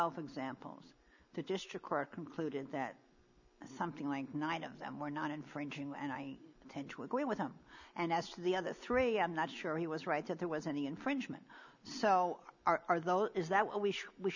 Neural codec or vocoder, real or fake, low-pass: none; real; 7.2 kHz